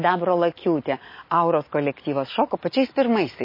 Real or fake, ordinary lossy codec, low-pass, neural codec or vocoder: real; MP3, 24 kbps; 5.4 kHz; none